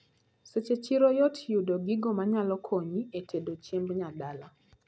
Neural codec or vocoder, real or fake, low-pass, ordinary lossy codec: none; real; none; none